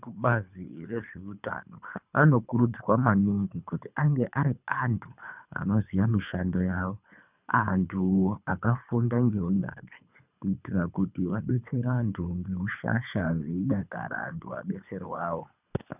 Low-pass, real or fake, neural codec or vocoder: 3.6 kHz; fake; codec, 24 kHz, 3 kbps, HILCodec